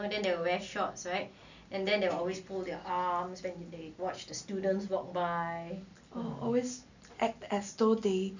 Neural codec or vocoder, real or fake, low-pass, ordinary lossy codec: none; real; 7.2 kHz; none